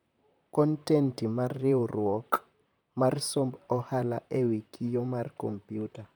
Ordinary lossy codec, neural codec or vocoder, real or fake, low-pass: none; vocoder, 44.1 kHz, 128 mel bands, Pupu-Vocoder; fake; none